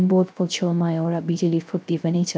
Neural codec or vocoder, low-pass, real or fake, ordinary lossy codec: codec, 16 kHz, 0.3 kbps, FocalCodec; none; fake; none